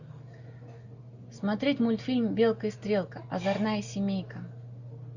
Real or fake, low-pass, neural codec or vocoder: real; 7.2 kHz; none